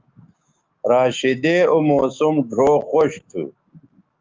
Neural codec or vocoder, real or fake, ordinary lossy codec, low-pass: autoencoder, 48 kHz, 128 numbers a frame, DAC-VAE, trained on Japanese speech; fake; Opus, 24 kbps; 7.2 kHz